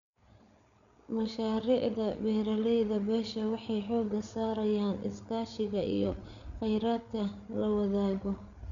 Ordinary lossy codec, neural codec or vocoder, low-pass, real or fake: none; codec, 16 kHz, 8 kbps, FreqCodec, larger model; 7.2 kHz; fake